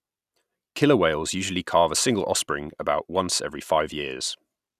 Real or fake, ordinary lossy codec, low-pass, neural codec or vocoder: real; none; 14.4 kHz; none